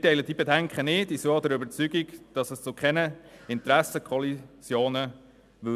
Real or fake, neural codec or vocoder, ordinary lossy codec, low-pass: real; none; none; 14.4 kHz